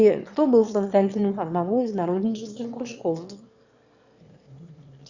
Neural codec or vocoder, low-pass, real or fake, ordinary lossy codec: autoencoder, 22.05 kHz, a latent of 192 numbers a frame, VITS, trained on one speaker; 7.2 kHz; fake; Opus, 64 kbps